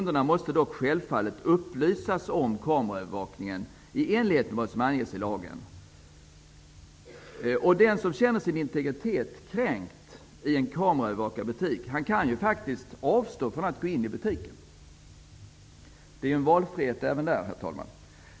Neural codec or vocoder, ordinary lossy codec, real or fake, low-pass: none; none; real; none